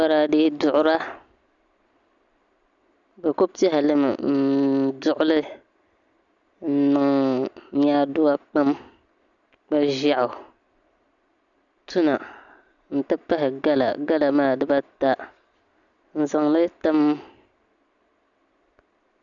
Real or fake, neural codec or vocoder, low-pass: real; none; 7.2 kHz